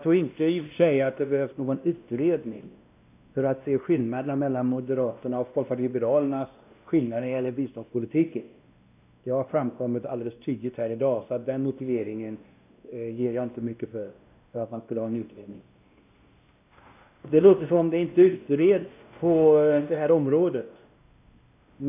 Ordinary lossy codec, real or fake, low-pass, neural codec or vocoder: none; fake; 3.6 kHz; codec, 16 kHz, 1 kbps, X-Codec, WavLM features, trained on Multilingual LibriSpeech